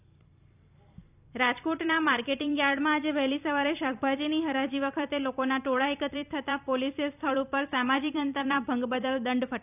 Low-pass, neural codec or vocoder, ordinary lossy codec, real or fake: 3.6 kHz; vocoder, 44.1 kHz, 128 mel bands every 256 samples, BigVGAN v2; none; fake